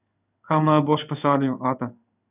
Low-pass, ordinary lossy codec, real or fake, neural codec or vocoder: 3.6 kHz; none; fake; codec, 16 kHz in and 24 kHz out, 1 kbps, XY-Tokenizer